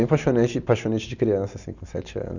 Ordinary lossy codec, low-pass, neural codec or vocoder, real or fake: none; 7.2 kHz; none; real